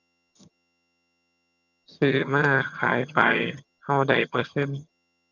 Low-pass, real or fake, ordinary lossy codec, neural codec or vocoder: 7.2 kHz; fake; none; vocoder, 22.05 kHz, 80 mel bands, HiFi-GAN